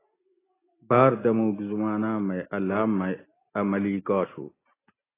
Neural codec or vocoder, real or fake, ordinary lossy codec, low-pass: none; real; AAC, 16 kbps; 3.6 kHz